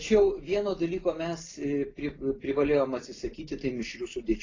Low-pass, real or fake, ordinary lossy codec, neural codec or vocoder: 7.2 kHz; real; AAC, 32 kbps; none